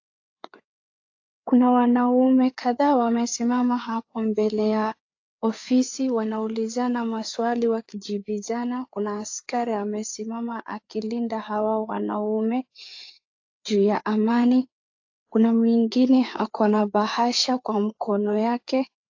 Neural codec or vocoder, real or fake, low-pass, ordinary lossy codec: codec, 16 kHz, 4 kbps, FreqCodec, larger model; fake; 7.2 kHz; AAC, 48 kbps